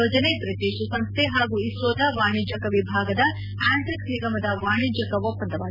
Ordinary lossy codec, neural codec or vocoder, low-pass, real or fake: none; none; 5.4 kHz; real